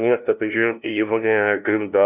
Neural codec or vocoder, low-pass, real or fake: codec, 16 kHz, 0.5 kbps, FunCodec, trained on LibriTTS, 25 frames a second; 3.6 kHz; fake